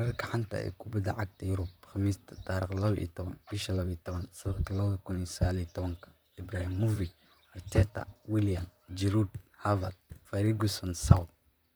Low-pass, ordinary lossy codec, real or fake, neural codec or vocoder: none; none; fake; vocoder, 44.1 kHz, 128 mel bands, Pupu-Vocoder